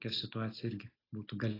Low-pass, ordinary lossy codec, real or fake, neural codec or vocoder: 5.4 kHz; AAC, 24 kbps; real; none